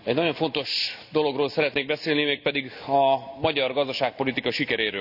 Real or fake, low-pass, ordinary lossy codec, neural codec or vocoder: real; 5.4 kHz; none; none